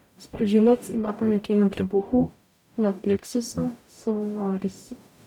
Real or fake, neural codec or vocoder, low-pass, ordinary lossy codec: fake; codec, 44.1 kHz, 0.9 kbps, DAC; 19.8 kHz; none